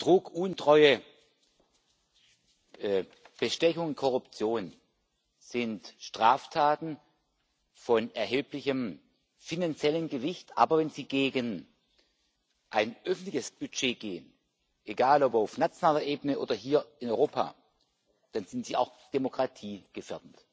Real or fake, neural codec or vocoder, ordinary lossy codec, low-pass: real; none; none; none